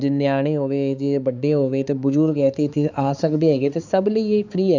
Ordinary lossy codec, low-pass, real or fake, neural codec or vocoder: none; 7.2 kHz; fake; codec, 16 kHz, 4 kbps, X-Codec, HuBERT features, trained on balanced general audio